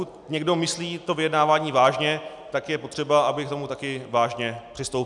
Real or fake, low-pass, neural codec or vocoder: real; 10.8 kHz; none